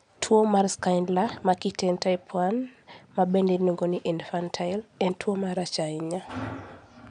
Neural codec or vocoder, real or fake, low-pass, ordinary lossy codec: none; real; 9.9 kHz; none